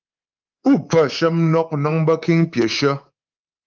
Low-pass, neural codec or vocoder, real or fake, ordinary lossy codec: 7.2 kHz; codec, 24 kHz, 3.1 kbps, DualCodec; fake; Opus, 32 kbps